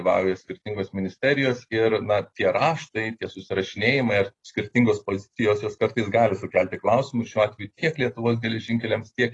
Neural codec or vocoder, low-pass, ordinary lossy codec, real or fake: none; 10.8 kHz; AAC, 32 kbps; real